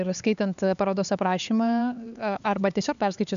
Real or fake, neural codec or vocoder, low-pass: fake; codec, 16 kHz, 4 kbps, X-Codec, HuBERT features, trained on LibriSpeech; 7.2 kHz